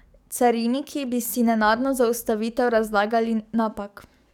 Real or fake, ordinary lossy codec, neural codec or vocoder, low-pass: fake; none; codec, 44.1 kHz, 7.8 kbps, DAC; 19.8 kHz